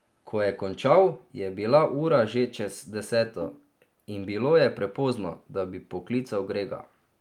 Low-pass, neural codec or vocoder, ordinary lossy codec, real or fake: 19.8 kHz; none; Opus, 32 kbps; real